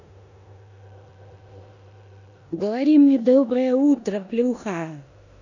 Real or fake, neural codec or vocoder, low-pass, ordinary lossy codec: fake; codec, 16 kHz in and 24 kHz out, 0.9 kbps, LongCat-Audio-Codec, four codebook decoder; 7.2 kHz; none